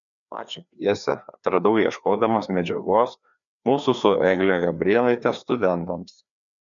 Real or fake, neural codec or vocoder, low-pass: fake; codec, 16 kHz, 2 kbps, FreqCodec, larger model; 7.2 kHz